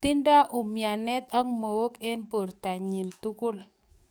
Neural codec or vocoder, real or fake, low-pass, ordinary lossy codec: codec, 44.1 kHz, 7.8 kbps, DAC; fake; none; none